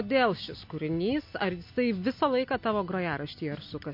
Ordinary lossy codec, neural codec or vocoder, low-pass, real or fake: MP3, 32 kbps; none; 5.4 kHz; real